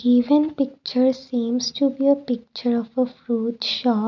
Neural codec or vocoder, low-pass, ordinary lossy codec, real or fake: none; 7.2 kHz; none; real